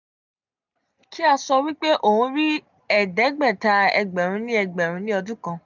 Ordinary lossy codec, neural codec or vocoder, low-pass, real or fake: none; none; 7.2 kHz; real